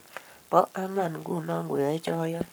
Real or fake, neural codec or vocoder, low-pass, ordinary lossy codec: fake; codec, 44.1 kHz, 7.8 kbps, Pupu-Codec; none; none